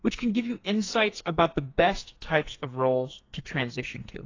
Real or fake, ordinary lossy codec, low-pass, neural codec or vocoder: fake; AAC, 32 kbps; 7.2 kHz; codec, 44.1 kHz, 2.6 kbps, SNAC